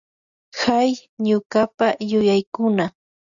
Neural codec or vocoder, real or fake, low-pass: none; real; 7.2 kHz